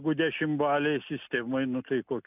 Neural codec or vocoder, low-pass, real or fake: none; 3.6 kHz; real